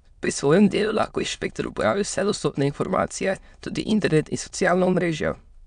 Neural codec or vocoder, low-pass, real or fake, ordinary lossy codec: autoencoder, 22.05 kHz, a latent of 192 numbers a frame, VITS, trained on many speakers; 9.9 kHz; fake; MP3, 96 kbps